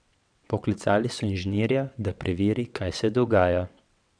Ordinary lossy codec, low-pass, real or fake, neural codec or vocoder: none; 9.9 kHz; fake; vocoder, 22.05 kHz, 80 mel bands, WaveNeXt